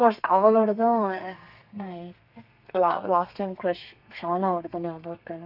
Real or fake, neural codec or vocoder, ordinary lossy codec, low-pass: fake; codec, 32 kHz, 1.9 kbps, SNAC; none; 5.4 kHz